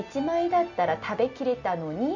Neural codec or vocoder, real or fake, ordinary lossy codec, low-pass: none; real; none; 7.2 kHz